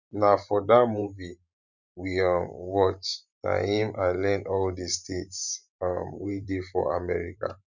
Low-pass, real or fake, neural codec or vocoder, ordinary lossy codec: 7.2 kHz; fake; vocoder, 44.1 kHz, 128 mel bands every 512 samples, BigVGAN v2; none